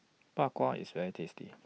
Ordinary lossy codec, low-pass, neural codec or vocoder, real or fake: none; none; none; real